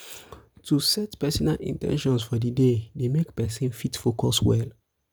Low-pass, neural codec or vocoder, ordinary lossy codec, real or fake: none; none; none; real